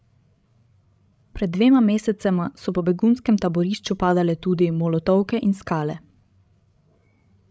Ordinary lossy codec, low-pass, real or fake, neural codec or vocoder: none; none; fake; codec, 16 kHz, 16 kbps, FreqCodec, larger model